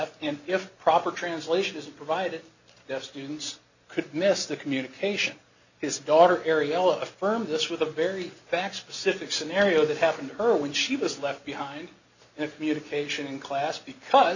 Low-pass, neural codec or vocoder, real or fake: 7.2 kHz; none; real